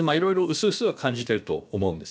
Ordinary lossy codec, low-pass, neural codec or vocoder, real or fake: none; none; codec, 16 kHz, about 1 kbps, DyCAST, with the encoder's durations; fake